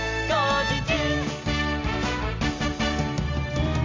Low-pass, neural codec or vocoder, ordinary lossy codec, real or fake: 7.2 kHz; none; MP3, 64 kbps; real